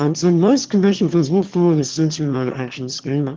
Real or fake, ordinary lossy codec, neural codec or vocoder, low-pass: fake; Opus, 16 kbps; autoencoder, 22.05 kHz, a latent of 192 numbers a frame, VITS, trained on one speaker; 7.2 kHz